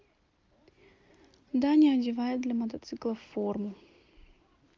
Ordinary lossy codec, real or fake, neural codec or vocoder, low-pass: Opus, 32 kbps; real; none; 7.2 kHz